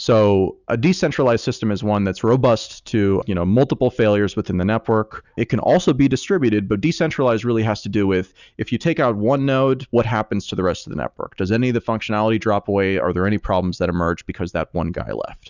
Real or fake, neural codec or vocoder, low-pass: real; none; 7.2 kHz